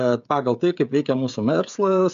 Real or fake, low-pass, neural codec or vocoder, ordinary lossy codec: fake; 7.2 kHz; codec, 16 kHz, 16 kbps, FreqCodec, smaller model; MP3, 64 kbps